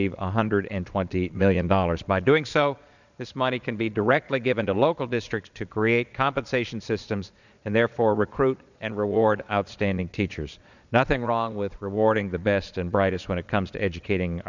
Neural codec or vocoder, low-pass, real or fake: vocoder, 22.05 kHz, 80 mel bands, Vocos; 7.2 kHz; fake